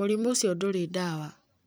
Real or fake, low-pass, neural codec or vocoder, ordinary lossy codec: fake; none; vocoder, 44.1 kHz, 128 mel bands every 256 samples, BigVGAN v2; none